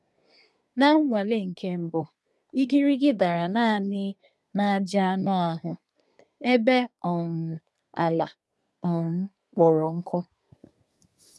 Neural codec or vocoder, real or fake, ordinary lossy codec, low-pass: codec, 24 kHz, 1 kbps, SNAC; fake; none; none